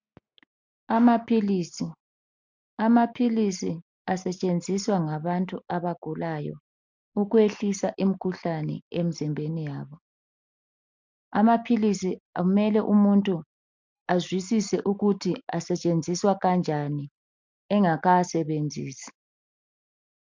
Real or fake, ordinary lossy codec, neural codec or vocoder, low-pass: real; MP3, 64 kbps; none; 7.2 kHz